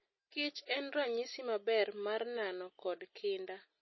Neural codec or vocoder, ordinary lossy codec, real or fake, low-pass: none; MP3, 24 kbps; real; 7.2 kHz